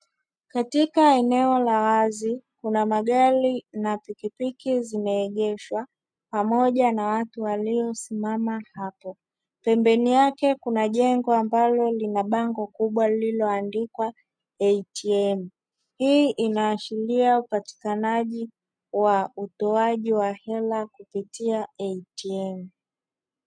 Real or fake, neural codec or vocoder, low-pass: real; none; 9.9 kHz